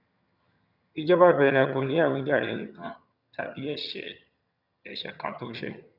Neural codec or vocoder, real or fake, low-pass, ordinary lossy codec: vocoder, 22.05 kHz, 80 mel bands, HiFi-GAN; fake; 5.4 kHz; none